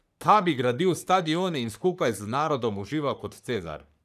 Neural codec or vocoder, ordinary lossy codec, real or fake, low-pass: codec, 44.1 kHz, 3.4 kbps, Pupu-Codec; none; fake; 14.4 kHz